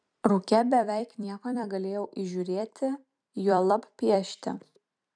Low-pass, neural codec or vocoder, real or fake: 9.9 kHz; vocoder, 44.1 kHz, 128 mel bands every 256 samples, BigVGAN v2; fake